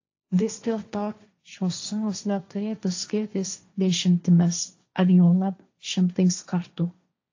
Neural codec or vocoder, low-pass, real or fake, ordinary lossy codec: codec, 16 kHz, 1.1 kbps, Voila-Tokenizer; 7.2 kHz; fake; AAC, 48 kbps